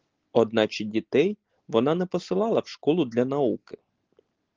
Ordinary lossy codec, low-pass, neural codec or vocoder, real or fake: Opus, 16 kbps; 7.2 kHz; none; real